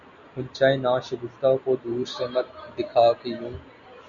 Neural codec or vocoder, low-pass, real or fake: none; 7.2 kHz; real